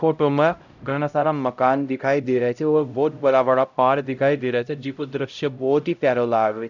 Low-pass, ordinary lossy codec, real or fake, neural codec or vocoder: 7.2 kHz; none; fake; codec, 16 kHz, 0.5 kbps, X-Codec, HuBERT features, trained on LibriSpeech